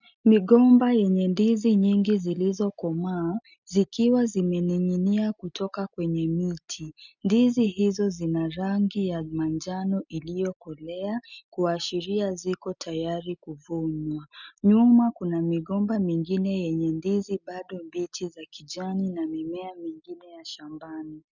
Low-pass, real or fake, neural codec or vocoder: 7.2 kHz; real; none